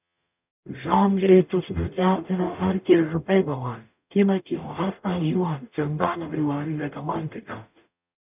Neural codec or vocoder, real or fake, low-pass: codec, 44.1 kHz, 0.9 kbps, DAC; fake; 3.6 kHz